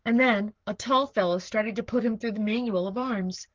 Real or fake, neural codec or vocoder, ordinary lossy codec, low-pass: fake; codec, 44.1 kHz, 7.8 kbps, Pupu-Codec; Opus, 32 kbps; 7.2 kHz